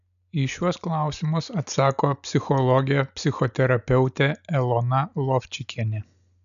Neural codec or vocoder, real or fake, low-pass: none; real; 7.2 kHz